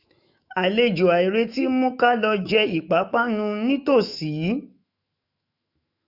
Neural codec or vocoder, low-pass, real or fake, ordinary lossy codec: autoencoder, 48 kHz, 128 numbers a frame, DAC-VAE, trained on Japanese speech; 5.4 kHz; fake; none